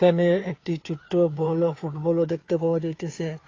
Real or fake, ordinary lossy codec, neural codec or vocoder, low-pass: fake; AAC, 32 kbps; codec, 16 kHz, 2 kbps, FunCodec, trained on Chinese and English, 25 frames a second; 7.2 kHz